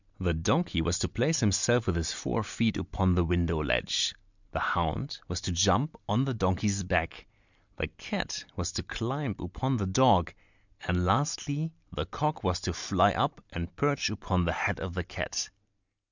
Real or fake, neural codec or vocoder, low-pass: real; none; 7.2 kHz